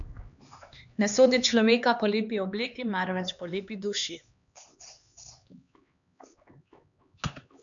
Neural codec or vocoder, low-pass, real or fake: codec, 16 kHz, 2 kbps, X-Codec, HuBERT features, trained on LibriSpeech; 7.2 kHz; fake